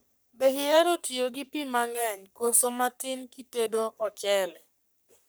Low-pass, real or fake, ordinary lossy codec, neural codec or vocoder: none; fake; none; codec, 44.1 kHz, 3.4 kbps, Pupu-Codec